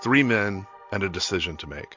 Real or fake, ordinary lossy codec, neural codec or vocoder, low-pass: real; MP3, 64 kbps; none; 7.2 kHz